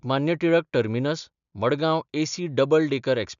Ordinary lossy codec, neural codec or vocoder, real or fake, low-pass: none; none; real; 7.2 kHz